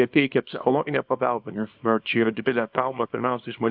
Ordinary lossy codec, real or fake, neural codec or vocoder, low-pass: AAC, 48 kbps; fake; codec, 24 kHz, 0.9 kbps, WavTokenizer, small release; 5.4 kHz